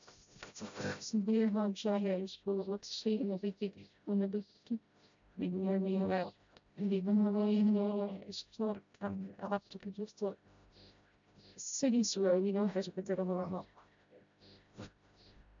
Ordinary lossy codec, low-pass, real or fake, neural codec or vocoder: MP3, 64 kbps; 7.2 kHz; fake; codec, 16 kHz, 0.5 kbps, FreqCodec, smaller model